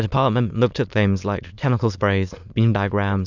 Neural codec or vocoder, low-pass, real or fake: autoencoder, 22.05 kHz, a latent of 192 numbers a frame, VITS, trained on many speakers; 7.2 kHz; fake